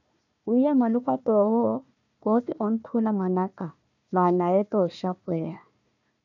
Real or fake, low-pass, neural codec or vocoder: fake; 7.2 kHz; codec, 16 kHz, 1 kbps, FunCodec, trained on Chinese and English, 50 frames a second